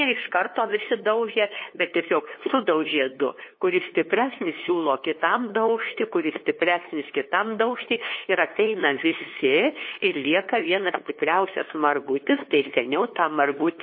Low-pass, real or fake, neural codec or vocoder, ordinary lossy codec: 5.4 kHz; fake; codec, 16 kHz, 2 kbps, FunCodec, trained on LibriTTS, 25 frames a second; MP3, 24 kbps